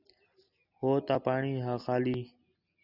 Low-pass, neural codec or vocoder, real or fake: 5.4 kHz; none; real